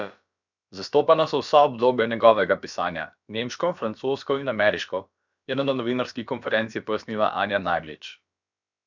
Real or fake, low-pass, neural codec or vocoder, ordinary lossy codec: fake; 7.2 kHz; codec, 16 kHz, about 1 kbps, DyCAST, with the encoder's durations; none